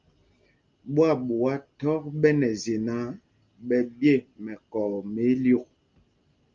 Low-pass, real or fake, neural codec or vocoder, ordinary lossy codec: 7.2 kHz; real; none; Opus, 24 kbps